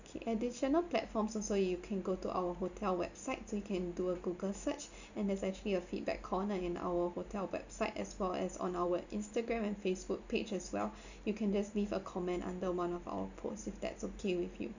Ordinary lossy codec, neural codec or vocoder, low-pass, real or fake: none; none; 7.2 kHz; real